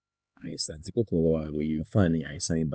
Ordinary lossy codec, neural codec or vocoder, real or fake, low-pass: none; codec, 16 kHz, 2 kbps, X-Codec, HuBERT features, trained on LibriSpeech; fake; none